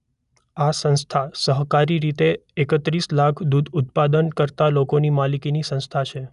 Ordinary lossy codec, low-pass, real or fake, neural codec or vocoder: none; 10.8 kHz; real; none